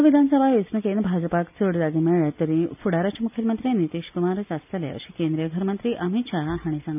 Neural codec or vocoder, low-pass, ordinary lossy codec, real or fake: none; 3.6 kHz; none; real